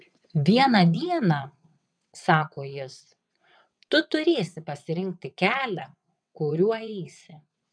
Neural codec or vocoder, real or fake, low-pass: vocoder, 22.05 kHz, 80 mel bands, WaveNeXt; fake; 9.9 kHz